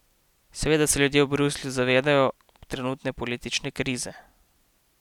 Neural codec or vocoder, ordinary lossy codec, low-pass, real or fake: none; none; 19.8 kHz; real